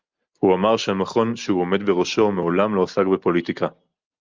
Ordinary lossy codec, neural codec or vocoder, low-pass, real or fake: Opus, 24 kbps; none; 7.2 kHz; real